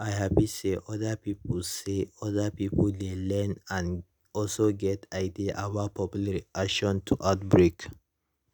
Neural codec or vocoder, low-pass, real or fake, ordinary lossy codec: none; none; real; none